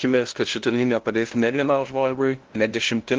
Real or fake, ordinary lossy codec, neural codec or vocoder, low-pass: fake; Opus, 16 kbps; codec, 16 kHz, 0.5 kbps, FunCodec, trained on LibriTTS, 25 frames a second; 7.2 kHz